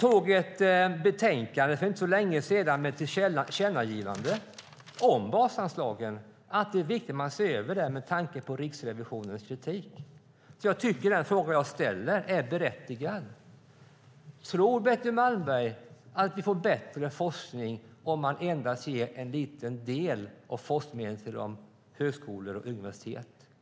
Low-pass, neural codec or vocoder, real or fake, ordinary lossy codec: none; none; real; none